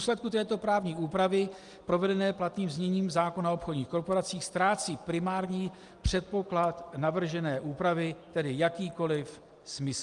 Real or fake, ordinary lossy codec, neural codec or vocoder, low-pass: real; Opus, 32 kbps; none; 10.8 kHz